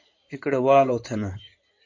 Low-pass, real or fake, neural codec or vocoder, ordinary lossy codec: 7.2 kHz; fake; codec, 16 kHz in and 24 kHz out, 2.2 kbps, FireRedTTS-2 codec; MP3, 48 kbps